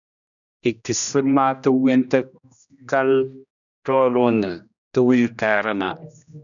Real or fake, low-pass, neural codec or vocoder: fake; 7.2 kHz; codec, 16 kHz, 1 kbps, X-Codec, HuBERT features, trained on general audio